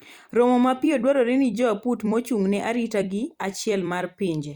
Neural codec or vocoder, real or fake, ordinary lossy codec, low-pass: vocoder, 44.1 kHz, 128 mel bands every 256 samples, BigVGAN v2; fake; Opus, 64 kbps; 19.8 kHz